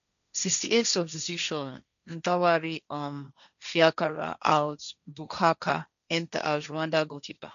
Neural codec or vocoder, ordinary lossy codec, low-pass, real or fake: codec, 16 kHz, 1.1 kbps, Voila-Tokenizer; none; 7.2 kHz; fake